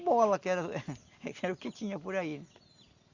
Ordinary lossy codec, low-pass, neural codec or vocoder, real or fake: Opus, 64 kbps; 7.2 kHz; none; real